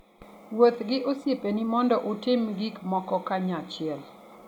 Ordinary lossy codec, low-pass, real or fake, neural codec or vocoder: none; 19.8 kHz; real; none